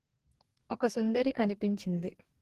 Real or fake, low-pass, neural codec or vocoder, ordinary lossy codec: fake; 14.4 kHz; codec, 44.1 kHz, 2.6 kbps, DAC; Opus, 16 kbps